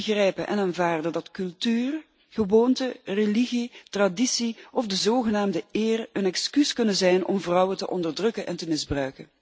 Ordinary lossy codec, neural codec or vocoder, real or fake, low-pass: none; none; real; none